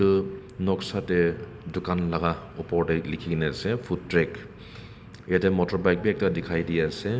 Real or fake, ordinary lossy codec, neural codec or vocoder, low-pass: real; none; none; none